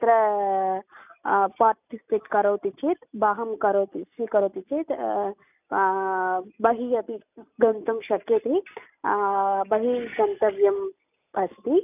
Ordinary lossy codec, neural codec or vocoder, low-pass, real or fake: none; none; 3.6 kHz; real